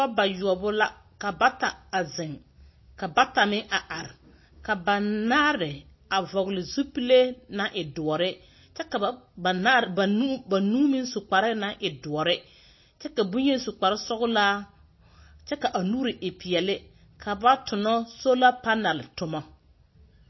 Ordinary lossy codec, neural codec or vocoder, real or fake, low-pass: MP3, 24 kbps; none; real; 7.2 kHz